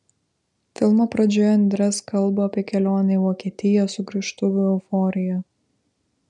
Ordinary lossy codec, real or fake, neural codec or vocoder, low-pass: MP3, 96 kbps; real; none; 10.8 kHz